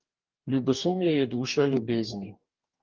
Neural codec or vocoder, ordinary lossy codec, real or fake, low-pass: codec, 44.1 kHz, 2.6 kbps, DAC; Opus, 32 kbps; fake; 7.2 kHz